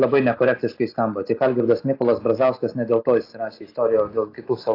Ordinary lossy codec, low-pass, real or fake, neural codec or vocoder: AAC, 32 kbps; 5.4 kHz; real; none